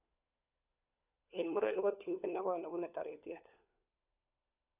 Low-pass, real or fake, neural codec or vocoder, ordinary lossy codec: 3.6 kHz; fake; codec, 16 kHz, 4 kbps, FunCodec, trained on LibriTTS, 50 frames a second; none